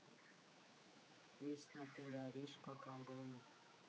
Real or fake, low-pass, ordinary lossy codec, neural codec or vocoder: fake; none; none; codec, 16 kHz, 4 kbps, X-Codec, HuBERT features, trained on general audio